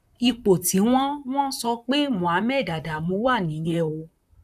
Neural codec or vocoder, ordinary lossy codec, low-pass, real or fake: vocoder, 44.1 kHz, 128 mel bands, Pupu-Vocoder; none; 14.4 kHz; fake